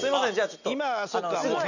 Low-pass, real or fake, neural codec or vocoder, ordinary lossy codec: 7.2 kHz; real; none; none